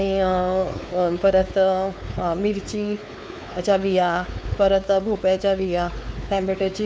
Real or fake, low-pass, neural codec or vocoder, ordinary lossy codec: fake; none; codec, 16 kHz, 4 kbps, X-Codec, WavLM features, trained on Multilingual LibriSpeech; none